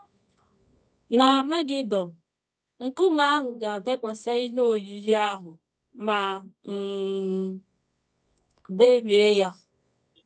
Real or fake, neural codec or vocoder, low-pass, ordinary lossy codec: fake; codec, 24 kHz, 0.9 kbps, WavTokenizer, medium music audio release; 9.9 kHz; none